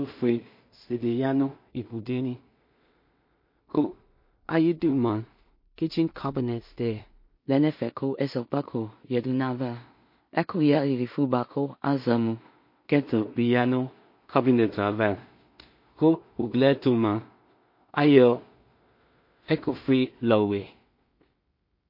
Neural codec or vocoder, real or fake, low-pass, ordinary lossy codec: codec, 16 kHz in and 24 kHz out, 0.4 kbps, LongCat-Audio-Codec, two codebook decoder; fake; 5.4 kHz; MP3, 32 kbps